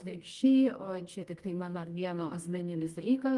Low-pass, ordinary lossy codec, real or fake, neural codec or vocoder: 10.8 kHz; Opus, 24 kbps; fake; codec, 24 kHz, 0.9 kbps, WavTokenizer, medium music audio release